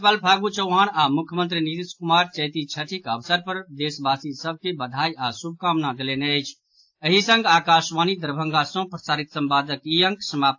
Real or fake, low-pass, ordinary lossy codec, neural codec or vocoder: real; 7.2 kHz; AAC, 48 kbps; none